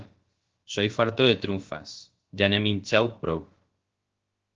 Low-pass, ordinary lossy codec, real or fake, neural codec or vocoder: 7.2 kHz; Opus, 16 kbps; fake; codec, 16 kHz, about 1 kbps, DyCAST, with the encoder's durations